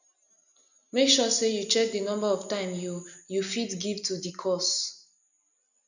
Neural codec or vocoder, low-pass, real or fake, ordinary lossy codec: none; 7.2 kHz; real; none